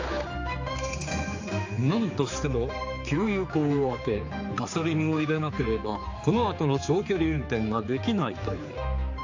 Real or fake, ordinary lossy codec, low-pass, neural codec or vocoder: fake; AAC, 48 kbps; 7.2 kHz; codec, 16 kHz, 4 kbps, X-Codec, HuBERT features, trained on general audio